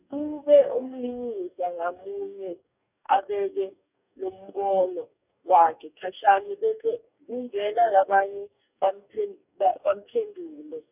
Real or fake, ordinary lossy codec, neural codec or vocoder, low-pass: fake; none; codec, 44.1 kHz, 2.6 kbps, DAC; 3.6 kHz